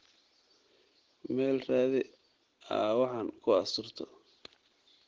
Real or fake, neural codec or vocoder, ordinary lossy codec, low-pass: real; none; Opus, 16 kbps; 7.2 kHz